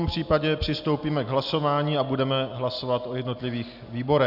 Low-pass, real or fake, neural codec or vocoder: 5.4 kHz; real; none